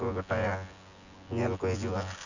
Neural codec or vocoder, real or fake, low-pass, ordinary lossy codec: vocoder, 24 kHz, 100 mel bands, Vocos; fake; 7.2 kHz; none